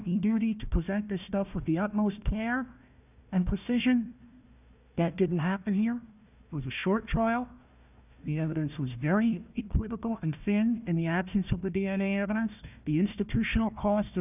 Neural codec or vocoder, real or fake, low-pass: codec, 16 kHz, 1 kbps, FunCodec, trained on Chinese and English, 50 frames a second; fake; 3.6 kHz